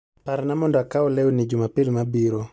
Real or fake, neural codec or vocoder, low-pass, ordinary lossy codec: real; none; none; none